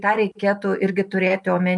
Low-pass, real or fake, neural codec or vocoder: 10.8 kHz; real; none